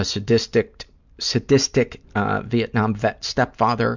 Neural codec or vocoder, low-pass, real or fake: none; 7.2 kHz; real